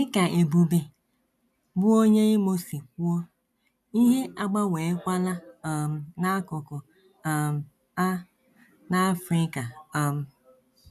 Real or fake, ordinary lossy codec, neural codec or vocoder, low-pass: real; none; none; 14.4 kHz